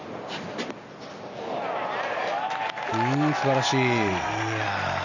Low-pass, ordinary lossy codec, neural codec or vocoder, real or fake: 7.2 kHz; none; none; real